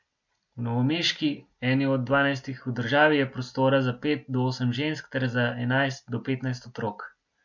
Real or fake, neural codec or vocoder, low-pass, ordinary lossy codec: real; none; 7.2 kHz; MP3, 64 kbps